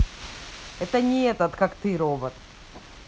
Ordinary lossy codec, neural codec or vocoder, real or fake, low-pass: none; none; real; none